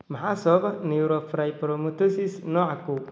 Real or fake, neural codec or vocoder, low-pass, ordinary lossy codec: real; none; none; none